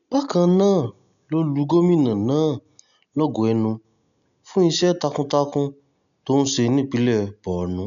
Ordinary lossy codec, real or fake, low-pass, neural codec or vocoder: none; real; 7.2 kHz; none